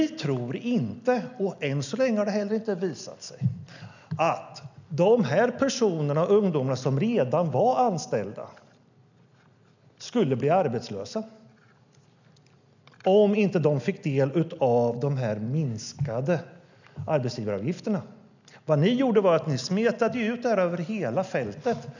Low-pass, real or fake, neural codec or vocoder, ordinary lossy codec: 7.2 kHz; real; none; none